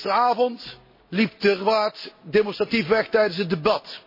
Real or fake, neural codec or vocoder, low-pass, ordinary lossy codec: real; none; 5.4 kHz; MP3, 24 kbps